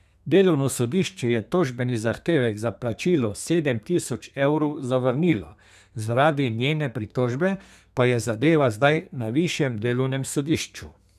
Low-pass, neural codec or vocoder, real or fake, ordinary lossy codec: 14.4 kHz; codec, 32 kHz, 1.9 kbps, SNAC; fake; none